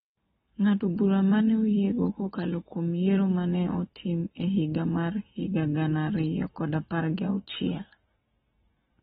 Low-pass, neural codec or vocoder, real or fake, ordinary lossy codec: 10.8 kHz; none; real; AAC, 16 kbps